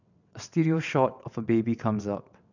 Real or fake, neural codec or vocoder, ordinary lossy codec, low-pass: fake; vocoder, 22.05 kHz, 80 mel bands, WaveNeXt; none; 7.2 kHz